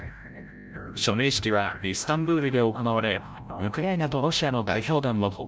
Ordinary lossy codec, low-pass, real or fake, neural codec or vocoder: none; none; fake; codec, 16 kHz, 0.5 kbps, FreqCodec, larger model